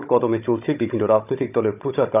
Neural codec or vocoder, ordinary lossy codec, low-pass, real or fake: codec, 16 kHz, 16 kbps, FunCodec, trained on Chinese and English, 50 frames a second; none; 3.6 kHz; fake